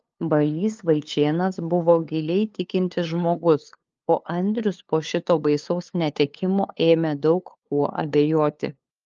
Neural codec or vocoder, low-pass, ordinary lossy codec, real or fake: codec, 16 kHz, 2 kbps, FunCodec, trained on LibriTTS, 25 frames a second; 7.2 kHz; Opus, 24 kbps; fake